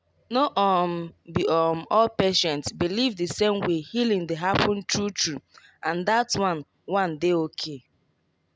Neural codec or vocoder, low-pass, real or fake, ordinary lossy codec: none; none; real; none